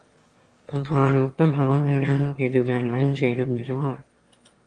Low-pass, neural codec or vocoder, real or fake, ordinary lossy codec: 9.9 kHz; autoencoder, 22.05 kHz, a latent of 192 numbers a frame, VITS, trained on one speaker; fake; Opus, 32 kbps